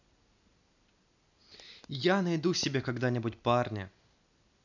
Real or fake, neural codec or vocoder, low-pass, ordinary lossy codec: real; none; 7.2 kHz; none